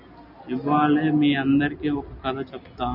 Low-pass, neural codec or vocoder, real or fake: 5.4 kHz; none; real